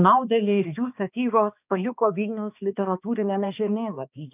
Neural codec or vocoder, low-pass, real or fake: codec, 16 kHz, 2 kbps, X-Codec, HuBERT features, trained on balanced general audio; 3.6 kHz; fake